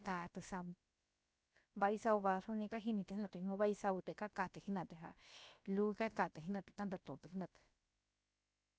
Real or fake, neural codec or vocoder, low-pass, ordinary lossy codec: fake; codec, 16 kHz, about 1 kbps, DyCAST, with the encoder's durations; none; none